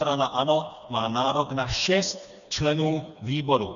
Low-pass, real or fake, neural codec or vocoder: 7.2 kHz; fake; codec, 16 kHz, 2 kbps, FreqCodec, smaller model